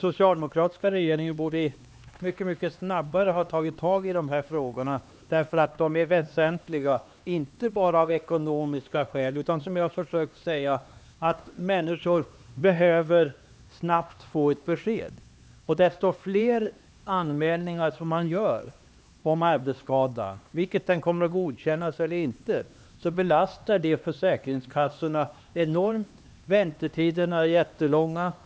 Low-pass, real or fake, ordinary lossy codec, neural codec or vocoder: none; fake; none; codec, 16 kHz, 2 kbps, X-Codec, HuBERT features, trained on LibriSpeech